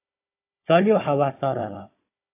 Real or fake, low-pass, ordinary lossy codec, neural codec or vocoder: fake; 3.6 kHz; AAC, 24 kbps; codec, 16 kHz, 16 kbps, FunCodec, trained on Chinese and English, 50 frames a second